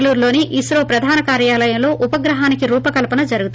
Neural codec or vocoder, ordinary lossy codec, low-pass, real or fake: none; none; none; real